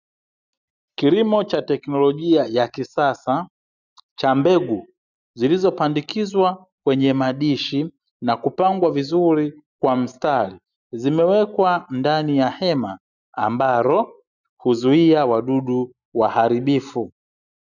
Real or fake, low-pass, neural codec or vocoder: real; 7.2 kHz; none